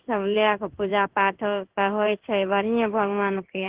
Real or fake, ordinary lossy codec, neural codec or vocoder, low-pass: fake; Opus, 16 kbps; codec, 16 kHz in and 24 kHz out, 1 kbps, XY-Tokenizer; 3.6 kHz